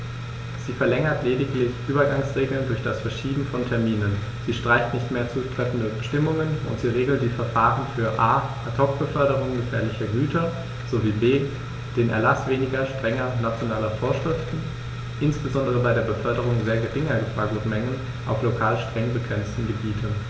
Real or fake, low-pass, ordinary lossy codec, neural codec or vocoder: real; none; none; none